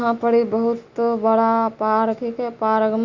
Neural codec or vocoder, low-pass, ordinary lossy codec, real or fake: none; 7.2 kHz; Opus, 64 kbps; real